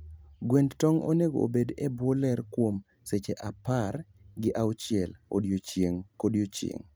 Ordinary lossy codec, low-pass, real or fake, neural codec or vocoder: none; none; real; none